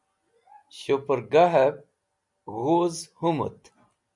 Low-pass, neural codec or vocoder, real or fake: 10.8 kHz; none; real